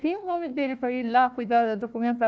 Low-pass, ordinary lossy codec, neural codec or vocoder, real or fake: none; none; codec, 16 kHz, 1 kbps, FunCodec, trained on Chinese and English, 50 frames a second; fake